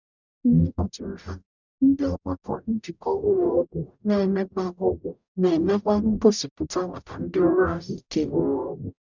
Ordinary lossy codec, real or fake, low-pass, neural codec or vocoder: none; fake; 7.2 kHz; codec, 44.1 kHz, 0.9 kbps, DAC